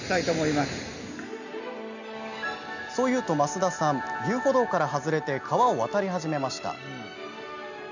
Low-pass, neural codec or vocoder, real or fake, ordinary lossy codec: 7.2 kHz; none; real; none